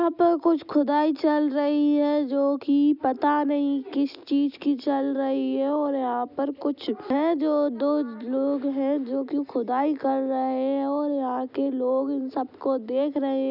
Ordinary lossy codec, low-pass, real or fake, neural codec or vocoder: none; 5.4 kHz; real; none